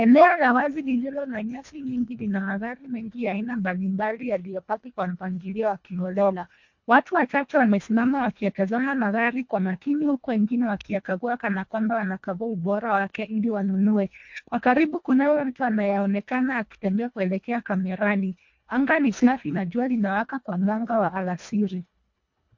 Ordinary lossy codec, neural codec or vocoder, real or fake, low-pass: MP3, 48 kbps; codec, 24 kHz, 1.5 kbps, HILCodec; fake; 7.2 kHz